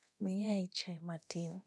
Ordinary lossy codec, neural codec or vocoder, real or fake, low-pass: none; codec, 24 kHz, 0.9 kbps, DualCodec; fake; none